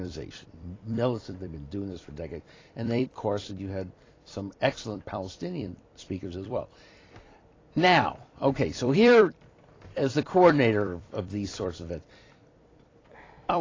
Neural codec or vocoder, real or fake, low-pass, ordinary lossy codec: vocoder, 44.1 kHz, 128 mel bands every 256 samples, BigVGAN v2; fake; 7.2 kHz; AAC, 32 kbps